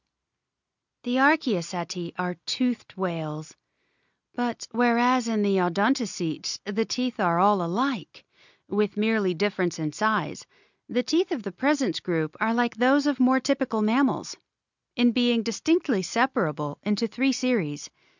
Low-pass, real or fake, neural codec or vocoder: 7.2 kHz; real; none